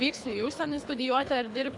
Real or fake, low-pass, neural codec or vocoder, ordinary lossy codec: fake; 10.8 kHz; codec, 24 kHz, 3 kbps, HILCodec; AAC, 64 kbps